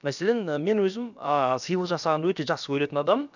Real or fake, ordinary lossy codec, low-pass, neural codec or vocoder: fake; none; 7.2 kHz; codec, 16 kHz, about 1 kbps, DyCAST, with the encoder's durations